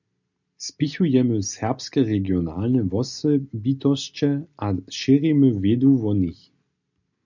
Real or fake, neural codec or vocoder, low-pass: real; none; 7.2 kHz